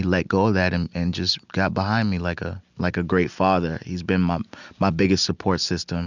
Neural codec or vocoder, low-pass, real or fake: none; 7.2 kHz; real